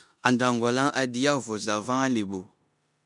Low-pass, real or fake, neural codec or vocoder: 10.8 kHz; fake; codec, 16 kHz in and 24 kHz out, 0.9 kbps, LongCat-Audio-Codec, four codebook decoder